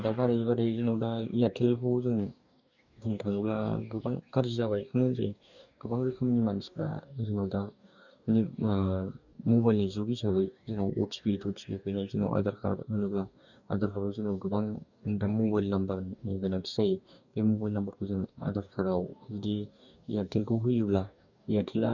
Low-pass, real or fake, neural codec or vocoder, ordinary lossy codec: 7.2 kHz; fake; codec, 44.1 kHz, 2.6 kbps, DAC; none